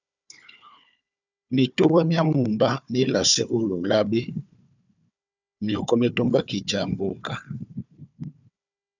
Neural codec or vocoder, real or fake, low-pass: codec, 16 kHz, 4 kbps, FunCodec, trained on Chinese and English, 50 frames a second; fake; 7.2 kHz